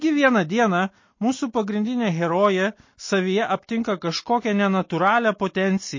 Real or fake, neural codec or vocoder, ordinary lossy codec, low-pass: real; none; MP3, 32 kbps; 7.2 kHz